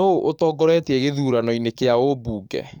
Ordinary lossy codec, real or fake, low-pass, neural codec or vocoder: none; fake; 19.8 kHz; codec, 44.1 kHz, 7.8 kbps, DAC